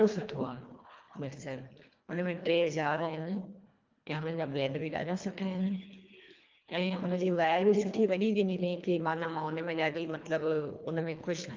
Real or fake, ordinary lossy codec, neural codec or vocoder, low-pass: fake; Opus, 32 kbps; codec, 24 kHz, 1.5 kbps, HILCodec; 7.2 kHz